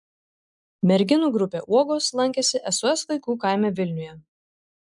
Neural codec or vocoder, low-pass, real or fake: none; 10.8 kHz; real